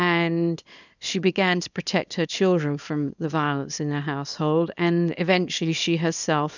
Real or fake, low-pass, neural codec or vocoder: fake; 7.2 kHz; codec, 24 kHz, 0.9 kbps, WavTokenizer, small release